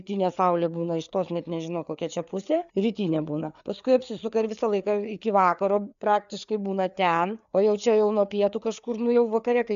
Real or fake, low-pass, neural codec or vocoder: fake; 7.2 kHz; codec, 16 kHz, 4 kbps, FreqCodec, larger model